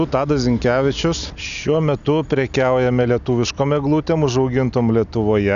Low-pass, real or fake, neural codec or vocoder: 7.2 kHz; real; none